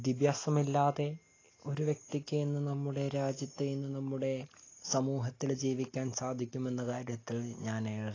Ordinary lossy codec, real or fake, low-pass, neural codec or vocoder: AAC, 32 kbps; real; 7.2 kHz; none